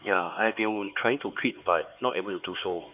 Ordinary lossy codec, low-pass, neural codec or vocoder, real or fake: none; 3.6 kHz; codec, 16 kHz, 4 kbps, X-Codec, HuBERT features, trained on LibriSpeech; fake